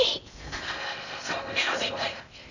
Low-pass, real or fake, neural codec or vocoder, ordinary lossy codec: 7.2 kHz; fake; codec, 16 kHz in and 24 kHz out, 0.6 kbps, FocalCodec, streaming, 2048 codes; none